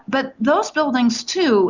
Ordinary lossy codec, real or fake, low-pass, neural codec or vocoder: Opus, 64 kbps; real; 7.2 kHz; none